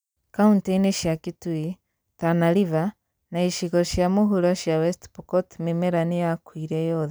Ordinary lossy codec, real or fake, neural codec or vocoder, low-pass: none; real; none; none